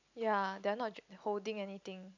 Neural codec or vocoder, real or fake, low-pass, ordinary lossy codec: none; real; 7.2 kHz; none